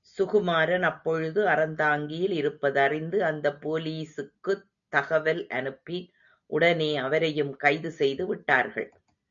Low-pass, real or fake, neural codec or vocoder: 7.2 kHz; real; none